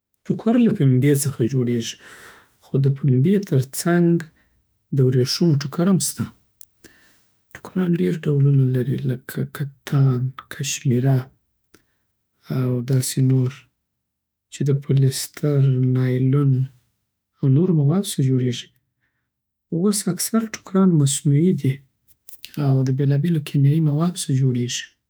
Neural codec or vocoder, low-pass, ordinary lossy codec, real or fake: autoencoder, 48 kHz, 32 numbers a frame, DAC-VAE, trained on Japanese speech; none; none; fake